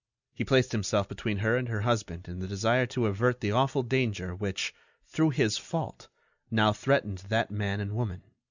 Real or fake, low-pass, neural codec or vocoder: real; 7.2 kHz; none